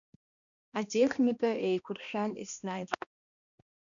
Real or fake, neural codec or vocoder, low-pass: fake; codec, 16 kHz, 1 kbps, X-Codec, HuBERT features, trained on balanced general audio; 7.2 kHz